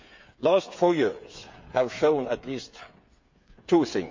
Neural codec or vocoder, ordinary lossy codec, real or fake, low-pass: codec, 16 kHz, 8 kbps, FreqCodec, smaller model; MP3, 48 kbps; fake; 7.2 kHz